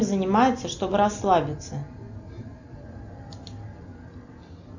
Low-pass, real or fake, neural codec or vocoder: 7.2 kHz; real; none